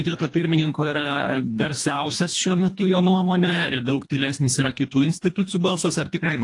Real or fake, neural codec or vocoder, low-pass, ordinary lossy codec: fake; codec, 24 kHz, 1.5 kbps, HILCodec; 10.8 kHz; AAC, 48 kbps